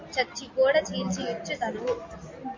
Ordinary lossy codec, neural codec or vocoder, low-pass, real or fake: MP3, 48 kbps; none; 7.2 kHz; real